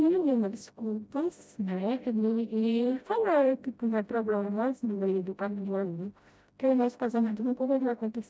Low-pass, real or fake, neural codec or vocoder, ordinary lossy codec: none; fake; codec, 16 kHz, 0.5 kbps, FreqCodec, smaller model; none